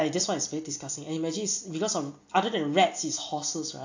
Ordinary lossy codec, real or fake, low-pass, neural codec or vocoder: AAC, 48 kbps; real; 7.2 kHz; none